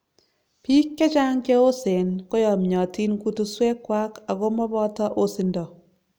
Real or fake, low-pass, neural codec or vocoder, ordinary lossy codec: real; none; none; none